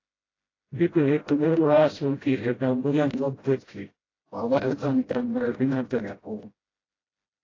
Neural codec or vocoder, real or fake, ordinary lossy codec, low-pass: codec, 16 kHz, 0.5 kbps, FreqCodec, smaller model; fake; AAC, 32 kbps; 7.2 kHz